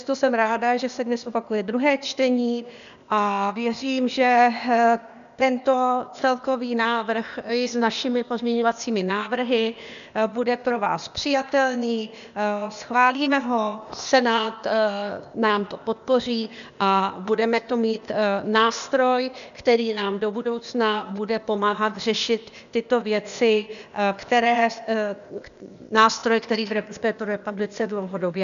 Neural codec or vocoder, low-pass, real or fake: codec, 16 kHz, 0.8 kbps, ZipCodec; 7.2 kHz; fake